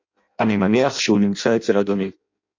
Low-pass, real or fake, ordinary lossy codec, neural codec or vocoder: 7.2 kHz; fake; MP3, 48 kbps; codec, 16 kHz in and 24 kHz out, 0.6 kbps, FireRedTTS-2 codec